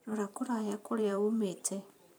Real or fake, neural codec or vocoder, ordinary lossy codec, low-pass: fake; vocoder, 44.1 kHz, 128 mel bands every 512 samples, BigVGAN v2; none; none